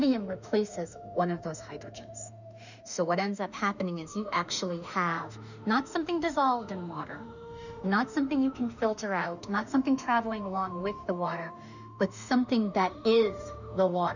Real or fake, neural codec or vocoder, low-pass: fake; autoencoder, 48 kHz, 32 numbers a frame, DAC-VAE, trained on Japanese speech; 7.2 kHz